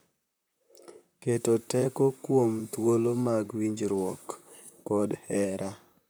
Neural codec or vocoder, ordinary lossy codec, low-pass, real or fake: vocoder, 44.1 kHz, 128 mel bands, Pupu-Vocoder; none; none; fake